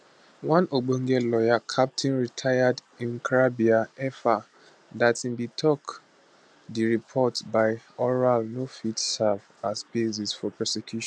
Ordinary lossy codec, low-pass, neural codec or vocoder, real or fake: none; none; none; real